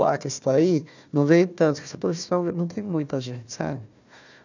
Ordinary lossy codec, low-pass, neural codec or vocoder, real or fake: none; 7.2 kHz; codec, 16 kHz, 1 kbps, FunCodec, trained on Chinese and English, 50 frames a second; fake